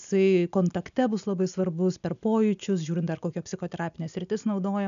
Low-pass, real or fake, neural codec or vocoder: 7.2 kHz; real; none